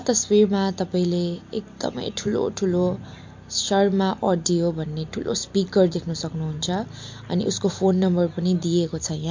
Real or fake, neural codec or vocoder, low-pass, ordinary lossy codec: real; none; 7.2 kHz; MP3, 48 kbps